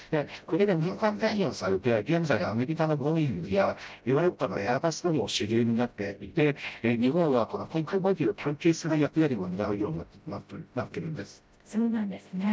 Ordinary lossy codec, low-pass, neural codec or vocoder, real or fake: none; none; codec, 16 kHz, 0.5 kbps, FreqCodec, smaller model; fake